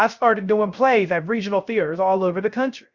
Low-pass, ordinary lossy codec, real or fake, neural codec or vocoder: 7.2 kHz; Opus, 64 kbps; fake; codec, 16 kHz, 0.3 kbps, FocalCodec